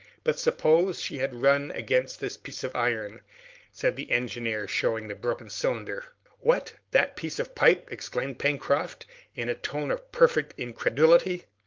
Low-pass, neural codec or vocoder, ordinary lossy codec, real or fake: 7.2 kHz; codec, 16 kHz, 4.8 kbps, FACodec; Opus, 24 kbps; fake